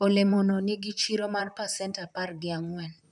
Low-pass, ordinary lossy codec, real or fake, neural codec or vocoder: 10.8 kHz; none; fake; vocoder, 44.1 kHz, 128 mel bands, Pupu-Vocoder